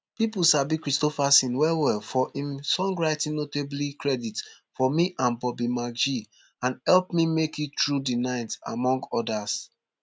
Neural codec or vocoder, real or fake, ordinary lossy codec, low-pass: none; real; none; none